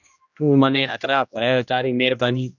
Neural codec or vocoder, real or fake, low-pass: codec, 16 kHz, 1 kbps, X-Codec, HuBERT features, trained on general audio; fake; 7.2 kHz